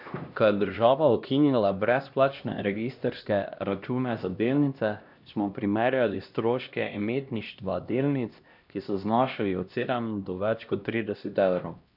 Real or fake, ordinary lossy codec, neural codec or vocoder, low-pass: fake; none; codec, 16 kHz, 1 kbps, X-Codec, HuBERT features, trained on LibriSpeech; 5.4 kHz